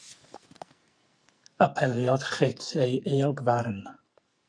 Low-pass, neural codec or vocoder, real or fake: 9.9 kHz; codec, 44.1 kHz, 2.6 kbps, SNAC; fake